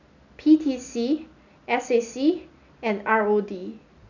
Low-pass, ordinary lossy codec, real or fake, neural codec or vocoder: 7.2 kHz; none; real; none